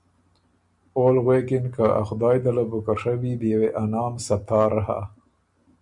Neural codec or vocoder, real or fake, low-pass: none; real; 10.8 kHz